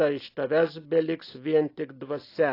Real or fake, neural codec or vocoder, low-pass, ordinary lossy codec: real; none; 5.4 kHz; AAC, 32 kbps